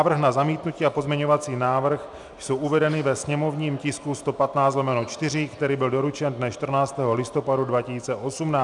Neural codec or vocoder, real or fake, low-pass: none; real; 10.8 kHz